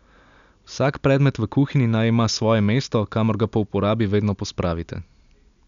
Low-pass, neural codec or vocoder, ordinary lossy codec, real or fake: 7.2 kHz; none; MP3, 96 kbps; real